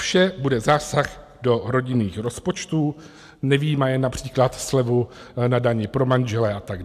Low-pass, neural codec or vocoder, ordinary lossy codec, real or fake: 14.4 kHz; none; AAC, 96 kbps; real